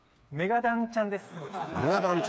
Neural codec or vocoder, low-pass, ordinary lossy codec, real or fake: codec, 16 kHz, 4 kbps, FreqCodec, smaller model; none; none; fake